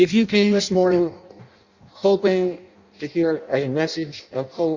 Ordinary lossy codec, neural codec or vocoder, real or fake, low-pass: Opus, 64 kbps; codec, 16 kHz in and 24 kHz out, 0.6 kbps, FireRedTTS-2 codec; fake; 7.2 kHz